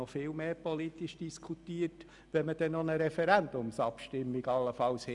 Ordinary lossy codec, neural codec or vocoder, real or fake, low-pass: none; none; real; 10.8 kHz